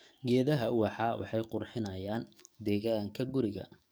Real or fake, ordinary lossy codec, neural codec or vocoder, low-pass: fake; none; codec, 44.1 kHz, 7.8 kbps, DAC; none